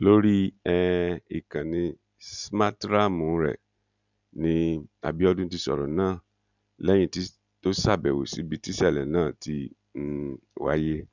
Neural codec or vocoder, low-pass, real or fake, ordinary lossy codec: none; 7.2 kHz; real; none